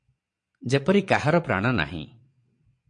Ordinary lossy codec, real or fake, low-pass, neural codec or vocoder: MP3, 48 kbps; fake; 9.9 kHz; vocoder, 22.05 kHz, 80 mel bands, Vocos